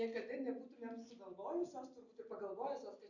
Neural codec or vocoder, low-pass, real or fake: none; 7.2 kHz; real